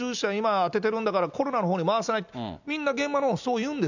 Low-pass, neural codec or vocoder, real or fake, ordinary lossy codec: 7.2 kHz; none; real; none